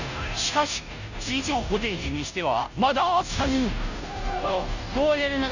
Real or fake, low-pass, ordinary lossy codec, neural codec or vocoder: fake; 7.2 kHz; none; codec, 16 kHz, 0.5 kbps, FunCodec, trained on Chinese and English, 25 frames a second